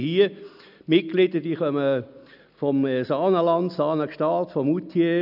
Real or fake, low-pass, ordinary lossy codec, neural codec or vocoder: real; 5.4 kHz; none; none